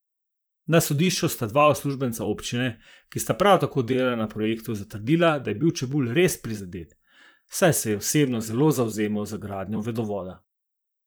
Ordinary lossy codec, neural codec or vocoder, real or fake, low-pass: none; vocoder, 44.1 kHz, 128 mel bands, Pupu-Vocoder; fake; none